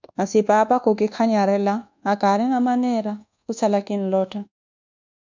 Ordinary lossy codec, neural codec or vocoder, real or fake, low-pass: MP3, 64 kbps; codec, 24 kHz, 1.2 kbps, DualCodec; fake; 7.2 kHz